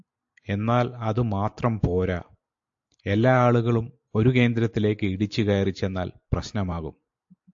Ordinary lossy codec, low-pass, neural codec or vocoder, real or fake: AAC, 64 kbps; 7.2 kHz; none; real